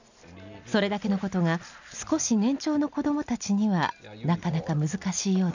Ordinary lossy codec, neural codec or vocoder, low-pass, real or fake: none; none; 7.2 kHz; real